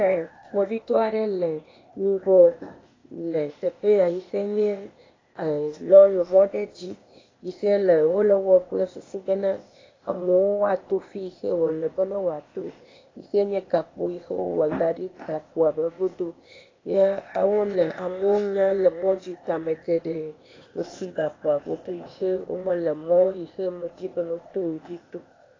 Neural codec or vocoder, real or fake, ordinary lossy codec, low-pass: codec, 16 kHz, 0.8 kbps, ZipCodec; fake; AAC, 32 kbps; 7.2 kHz